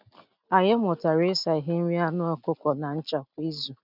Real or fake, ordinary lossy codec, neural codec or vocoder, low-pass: real; none; none; 5.4 kHz